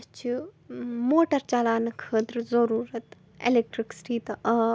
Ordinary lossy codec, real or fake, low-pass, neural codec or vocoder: none; real; none; none